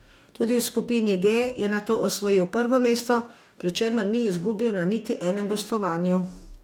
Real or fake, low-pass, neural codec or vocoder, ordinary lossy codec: fake; 19.8 kHz; codec, 44.1 kHz, 2.6 kbps, DAC; none